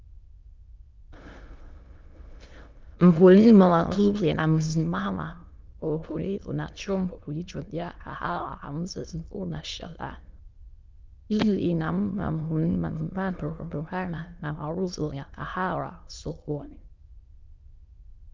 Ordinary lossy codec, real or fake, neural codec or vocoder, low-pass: Opus, 24 kbps; fake; autoencoder, 22.05 kHz, a latent of 192 numbers a frame, VITS, trained on many speakers; 7.2 kHz